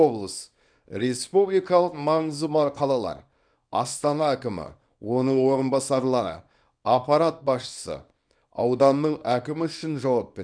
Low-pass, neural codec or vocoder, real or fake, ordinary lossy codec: 9.9 kHz; codec, 24 kHz, 0.9 kbps, WavTokenizer, small release; fake; none